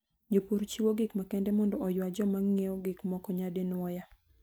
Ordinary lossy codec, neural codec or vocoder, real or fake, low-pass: none; none; real; none